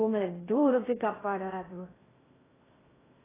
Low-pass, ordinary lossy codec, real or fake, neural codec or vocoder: 3.6 kHz; AAC, 16 kbps; fake; codec, 16 kHz in and 24 kHz out, 0.6 kbps, FocalCodec, streaming, 2048 codes